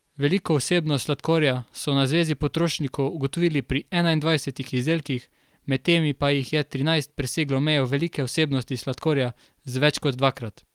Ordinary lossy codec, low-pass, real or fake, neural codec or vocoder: Opus, 32 kbps; 19.8 kHz; real; none